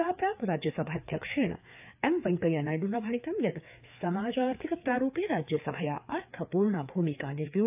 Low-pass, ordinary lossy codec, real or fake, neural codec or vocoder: 3.6 kHz; AAC, 32 kbps; fake; codec, 16 kHz, 4 kbps, FreqCodec, larger model